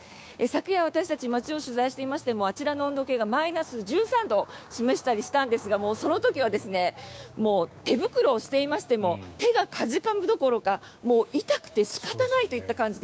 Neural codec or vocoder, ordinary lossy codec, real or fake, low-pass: codec, 16 kHz, 6 kbps, DAC; none; fake; none